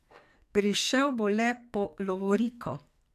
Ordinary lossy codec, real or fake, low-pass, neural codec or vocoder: AAC, 96 kbps; fake; 14.4 kHz; codec, 44.1 kHz, 2.6 kbps, SNAC